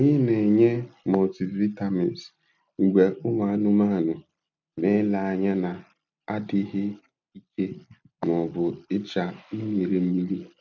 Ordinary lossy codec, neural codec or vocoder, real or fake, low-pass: MP3, 64 kbps; none; real; 7.2 kHz